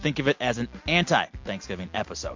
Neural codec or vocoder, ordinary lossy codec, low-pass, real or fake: none; MP3, 48 kbps; 7.2 kHz; real